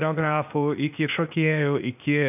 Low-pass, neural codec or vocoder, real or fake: 3.6 kHz; codec, 16 kHz, 0.8 kbps, ZipCodec; fake